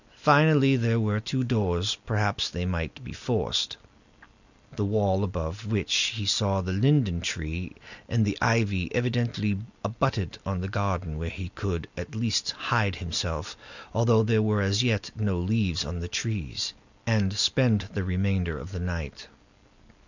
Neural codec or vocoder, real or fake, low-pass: none; real; 7.2 kHz